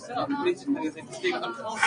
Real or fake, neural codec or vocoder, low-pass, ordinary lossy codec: real; none; 9.9 kHz; MP3, 96 kbps